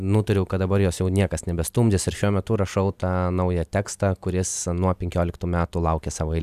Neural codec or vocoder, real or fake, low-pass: none; real; 14.4 kHz